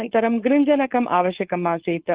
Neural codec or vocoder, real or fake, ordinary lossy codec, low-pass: codec, 16 kHz, 4.8 kbps, FACodec; fake; Opus, 16 kbps; 3.6 kHz